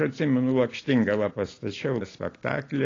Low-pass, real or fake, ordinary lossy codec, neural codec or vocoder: 7.2 kHz; real; AAC, 32 kbps; none